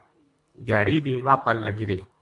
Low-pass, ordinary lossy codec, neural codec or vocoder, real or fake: 10.8 kHz; MP3, 64 kbps; codec, 24 kHz, 1.5 kbps, HILCodec; fake